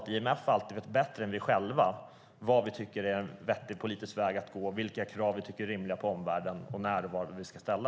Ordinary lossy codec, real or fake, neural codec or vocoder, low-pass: none; real; none; none